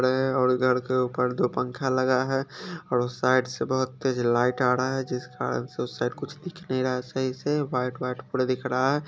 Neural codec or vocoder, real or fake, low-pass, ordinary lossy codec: none; real; none; none